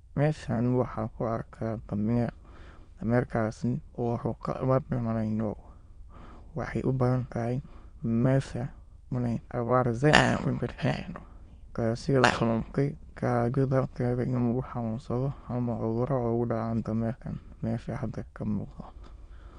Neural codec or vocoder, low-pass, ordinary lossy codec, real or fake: autoencoder, 22.05 kHz, a latent of 192 numbers a frame, VITS, trained on many speakers; 9.9 kHz; Opus, 64 kbps; fake